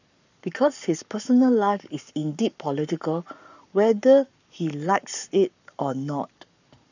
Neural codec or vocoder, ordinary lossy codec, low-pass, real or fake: codec, 44.1 kHz, 7.8 kbps, Pupu-Codec; none; 7.2 kHz; fake